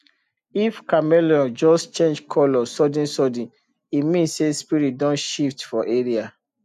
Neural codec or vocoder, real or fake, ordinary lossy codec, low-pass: none; real; none; 14.4 kHz